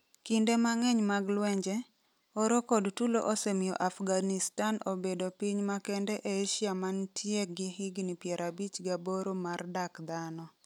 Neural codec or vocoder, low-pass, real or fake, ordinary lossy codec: none; 19.8 kHz; real; none